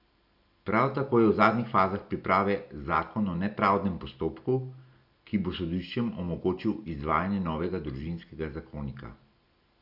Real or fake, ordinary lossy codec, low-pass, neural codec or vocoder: real; none; 5.4 kHz; none